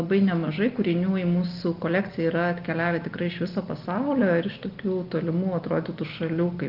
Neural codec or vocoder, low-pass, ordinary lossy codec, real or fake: none; 5.4 kHz; Opus, 32 kbps; real